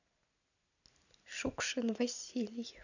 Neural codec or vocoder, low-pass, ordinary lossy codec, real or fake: none; 7.2 kHz; none; real